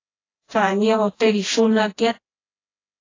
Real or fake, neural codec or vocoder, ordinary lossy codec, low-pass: fake; codec, 16 kHz, 1 kbps, FreqCodec, smaller model; AAC, 32 kbps; 7.2 kHz